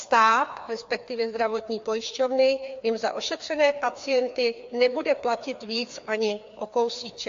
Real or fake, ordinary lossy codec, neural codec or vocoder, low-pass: fake; AAC, 48 kbps; codec, 16 kHz, 2 kbps, FreqCodec, larger model; 7.2 kHz